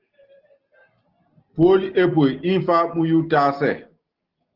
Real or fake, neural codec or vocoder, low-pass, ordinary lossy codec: real; none; 5.4 kHz; Opus, 16 kbps